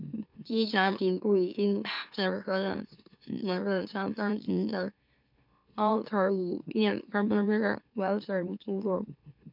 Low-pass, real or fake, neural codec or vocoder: 5.4 kHz; fake; autoencoder, 44.1 kHz, a latent of 192 numbers a frame, MeloTTS